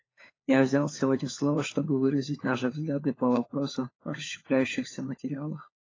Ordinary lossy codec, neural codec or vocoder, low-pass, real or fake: AAC, 32 kbps; codec, 16 kHz, 4 kbps, FunCodec, trained on LibriTTS, 50 frames a second; 7.2 kHz; fake